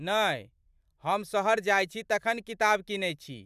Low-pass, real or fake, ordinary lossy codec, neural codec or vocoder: 14.4 kHz; fake; none; vocoder, 44.1 kHz, 128 mel bands every 512 samples, BigVGAN v2